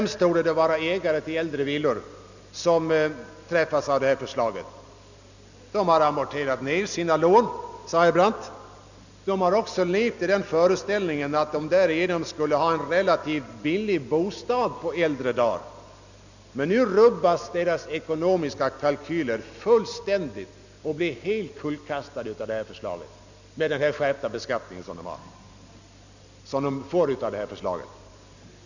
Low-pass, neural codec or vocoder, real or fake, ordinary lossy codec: 7.2 kHz; none; real; none